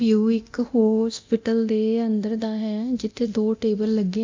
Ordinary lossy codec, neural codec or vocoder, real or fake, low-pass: MP3, 64 kbps; codec, 24 kHz, 0.9 kbps, DualCodec; fake; 7.2 kHz